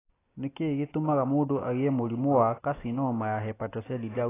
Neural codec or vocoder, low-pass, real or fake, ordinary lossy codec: none; 3.6 kHz; real; AAC, 16 kbps